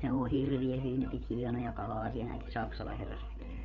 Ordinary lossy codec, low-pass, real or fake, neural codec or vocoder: none; 7.2 kHz; fake; codec, 16 kHz, 8 kbps, FreqCodec, larger model